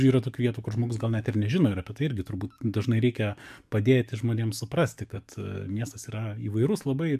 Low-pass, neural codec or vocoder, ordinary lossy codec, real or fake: 14.4 kHz; codec, 44.1 kHz, 7.8 kbps, DAC; MP3, 96 kbps; fake